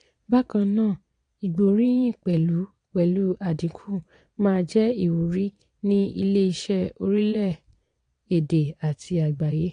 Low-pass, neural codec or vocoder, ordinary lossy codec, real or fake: 9.9 kHz; vocoder, 22.05 kHz, 80 mel bands, Vocos; AAC, 48 kbps; fake